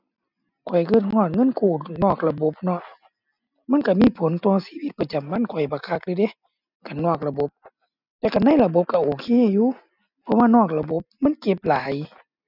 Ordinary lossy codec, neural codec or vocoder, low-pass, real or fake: none; none; 5.4 kHz; real